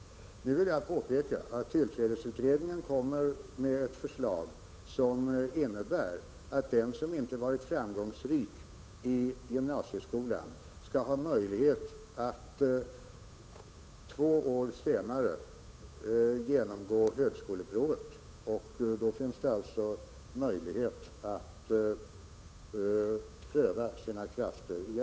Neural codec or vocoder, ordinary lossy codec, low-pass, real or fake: codec, 16 kHz, 8 kbps, FunCodec, trained on Chinese and English, 25 frames a second; none; none; fake